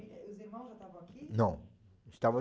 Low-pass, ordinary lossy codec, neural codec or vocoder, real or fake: none; none; none; real